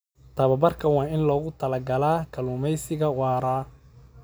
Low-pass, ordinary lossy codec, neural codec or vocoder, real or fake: none; none; none; real